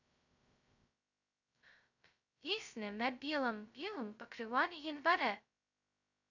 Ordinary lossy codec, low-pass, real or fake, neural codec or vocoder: none; 7.2 kHz; fake; codec, 16 kHz, 0.2 kbps, FocalCodec